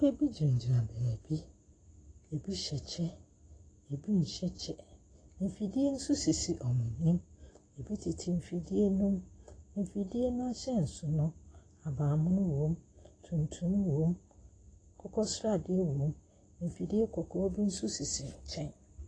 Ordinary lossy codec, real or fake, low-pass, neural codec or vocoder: AAC, 32 kbps; real; 9.9 kHz; none